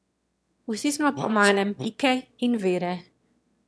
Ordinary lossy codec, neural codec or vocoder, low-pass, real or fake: none; autoencoder, 22.05 kHz, a latent of 192 numbers a frame, VITS, trained on one speaker; none; fake